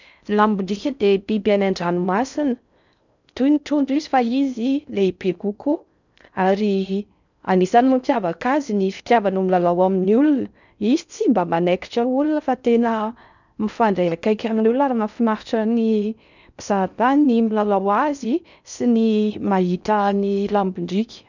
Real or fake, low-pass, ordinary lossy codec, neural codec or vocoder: fake; 7.2 kHz; none; codec, 16 kHz in and 24 kHz out, 0.6 kbps, FocalCodec, streaming, 4096 codes